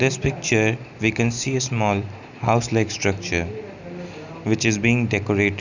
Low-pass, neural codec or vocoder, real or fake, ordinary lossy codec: 7.2 kHz; none; real; none